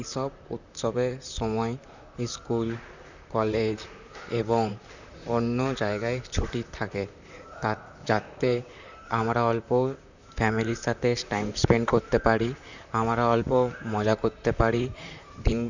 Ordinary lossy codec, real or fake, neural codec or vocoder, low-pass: none; fake; vocoder, 44.1 kHz, 128 mel bands, Pupu-Vocoder; 7.2 kHz